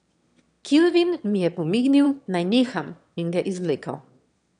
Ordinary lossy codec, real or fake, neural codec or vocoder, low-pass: none; fake; autoencoder, 22.05 kHz, a latent of 192 numbers a frame, VITS, trained on one speaker; 9.9 kHz